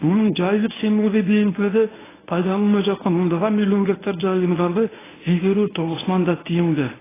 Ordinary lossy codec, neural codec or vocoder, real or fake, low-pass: AAC, 16 kbps; codec, 24 kHz, 0.9 kbps, WavTokenizer, medium speech release version 1; fake; 3.6 kHz